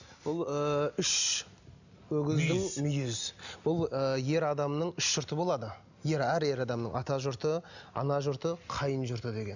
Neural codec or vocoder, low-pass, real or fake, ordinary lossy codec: none; 7.2 kHz; real; none